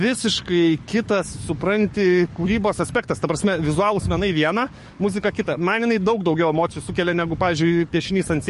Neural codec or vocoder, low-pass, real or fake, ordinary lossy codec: codec, 44.1 kHz, 7.8 kbps, DAC; 14.4 kHz; fake; MP3, 48 kbps